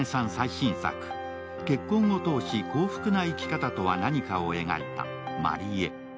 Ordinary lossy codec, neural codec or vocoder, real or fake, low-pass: none; none; real; none